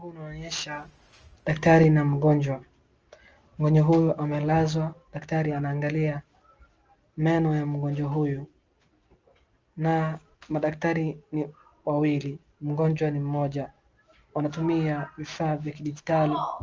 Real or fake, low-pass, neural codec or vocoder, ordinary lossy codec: real; 7.2 kHz; none; Opus, 24 kbps